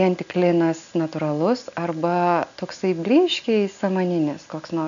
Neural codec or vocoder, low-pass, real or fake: none; 7.2 kHz; real